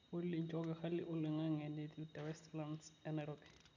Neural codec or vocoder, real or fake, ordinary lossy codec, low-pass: none; real; none; 7.2 kHz